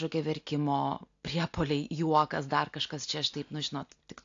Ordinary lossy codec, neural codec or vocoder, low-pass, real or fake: AAC, 48 kbps; none; 7.2 kHz; real